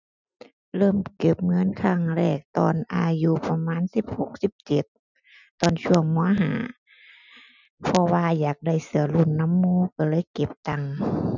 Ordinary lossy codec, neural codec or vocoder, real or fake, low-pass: none; none; real; 7.2 kHz